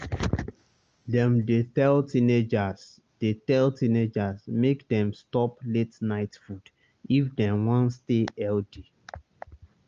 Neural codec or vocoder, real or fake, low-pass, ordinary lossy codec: none; real; 7.2 kHz; Opus, 24 kbps